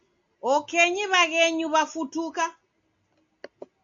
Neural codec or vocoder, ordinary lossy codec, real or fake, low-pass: none; MP3, 48 kbps; real; 7.2 kHz